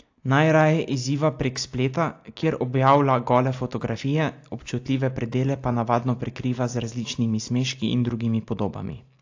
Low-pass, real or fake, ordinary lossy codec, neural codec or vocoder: 7.2 kHz; real; AAC, 48 kbps; none